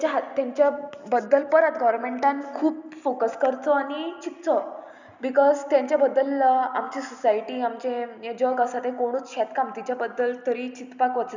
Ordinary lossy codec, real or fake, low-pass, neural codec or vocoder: none; real; 7.2 kHz; none